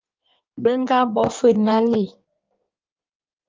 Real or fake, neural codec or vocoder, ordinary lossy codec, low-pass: fake; codec, 16 kHz in and 24 kHz out, 1.1 kbps, FireRedTTS-2 codec; Opus, 24 kbps; 7.2 kHz